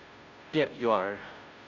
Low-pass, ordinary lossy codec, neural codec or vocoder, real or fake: 7.2 kHz; none; codec, 16 kHz, 0.5 kbps, FunCodec, trained on Chinese and English, 25 frames a second; fake